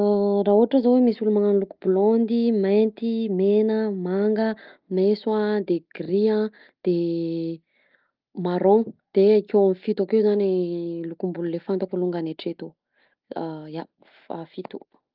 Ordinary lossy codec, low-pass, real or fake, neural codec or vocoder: Opus, 32 kbps; 5.4 kHz; real; none